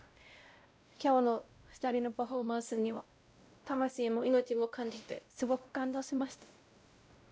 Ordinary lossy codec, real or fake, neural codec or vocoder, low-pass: none; fake; codec, 16 kHz, 0.5 kbps, X-Codec, WavLM features, trained on Multilingual LibriSpeech; none